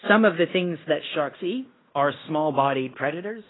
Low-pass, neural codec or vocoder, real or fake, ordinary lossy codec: 7.2 kHz; codec, 16 kHz in and 24 kHz out, 0.9 kbps, LongCat-Audio-Codec, fine tuned four codebook decoder; fake; AAC, 16 kbps